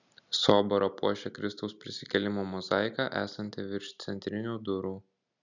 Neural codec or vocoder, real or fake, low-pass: none; real; 7.2 kHz